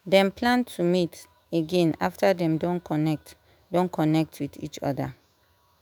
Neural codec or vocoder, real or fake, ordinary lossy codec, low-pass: autoencoder, 48 kHz, 128 numbers a frame, DAC-VAE, trained on Japanese speech; fake; none; none